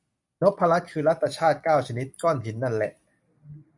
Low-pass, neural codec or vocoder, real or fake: 10.8 kHz; none; real